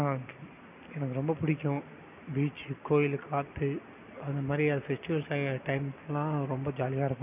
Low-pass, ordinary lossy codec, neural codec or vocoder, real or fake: 3.6 kHz; none; none; real